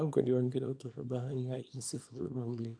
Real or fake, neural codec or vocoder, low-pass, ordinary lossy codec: fake; codec, 24 kHz, 0.9 kbps, WavTokenizer, small release; 9.9 kHz; none